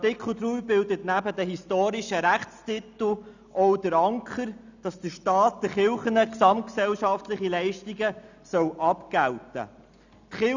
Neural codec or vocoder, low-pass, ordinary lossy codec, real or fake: none; 7.2 kHz; none; real